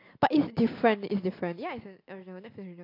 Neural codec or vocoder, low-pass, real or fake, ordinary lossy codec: none; 5.4 kHz; real; AAC, 32 kbps